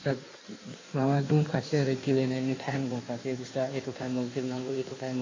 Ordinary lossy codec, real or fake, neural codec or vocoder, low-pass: AAC, 32 kbps; fake; codec, 16 kHz in and 24 kHz out, 1.1 kbps, FireRedTTS-2 codec; 7.2 kHz